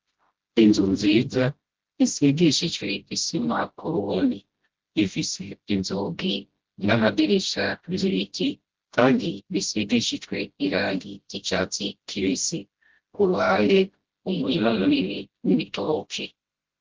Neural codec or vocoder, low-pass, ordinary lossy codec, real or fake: codec, 16 kHz, 0.5 kbps, FreqCodec, smaller model; 7.2 kHz; Opus, 16 kbps; fake